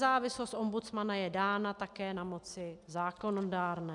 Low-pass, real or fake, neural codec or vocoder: 10.8 kHz; real; none